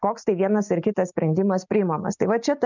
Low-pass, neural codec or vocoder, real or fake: 7.2 kHz; none; real